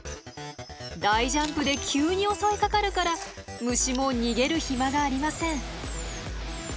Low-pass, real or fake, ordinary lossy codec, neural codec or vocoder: none; real; none; none